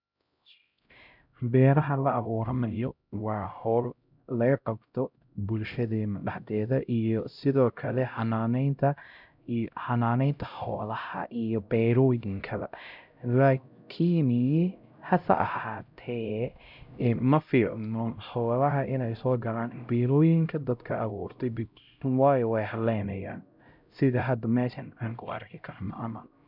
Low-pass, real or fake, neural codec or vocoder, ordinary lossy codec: 5.4 kHz; fake; codec, 16 kHz, 0.5 kbps, X-Codec, HuBERT features, trained on LibriSpeech; none